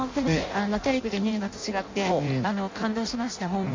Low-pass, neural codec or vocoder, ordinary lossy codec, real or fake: 7.2 kHz; codec, 16 kHz in and 24 kHz out, 0.6 kbps, FireRedTTS-2 codec; MP3, 48 kbps; fake